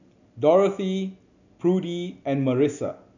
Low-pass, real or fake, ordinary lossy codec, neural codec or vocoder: 7.2 kHz; real; none; none